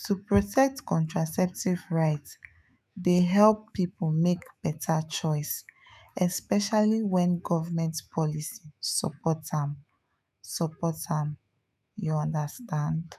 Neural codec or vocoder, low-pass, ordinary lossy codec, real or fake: autoencoder, 48 kHz, 128 numbers a frame, DAC-VAE, trained on Japanese speech; 14.4 kHz; none; fake